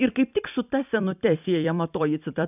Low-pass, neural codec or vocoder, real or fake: 3.6 kHz; vocoder, 44.1 kHz, 128 mel bands every 256 samples, BigVGAN v2; fake